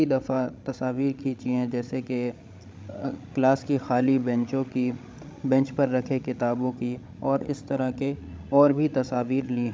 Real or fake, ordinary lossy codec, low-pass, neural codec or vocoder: fake; none; none; codec, 16 kHz, 16 kbps, FreqCodec, larger model